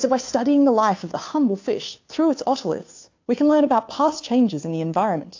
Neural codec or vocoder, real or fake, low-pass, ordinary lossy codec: codec, 16 kHz, 2 kbps, FunCodec, trained on Chinese and English, 25 frames a second; fake; 7.2 kHz; AAC, 48 kbps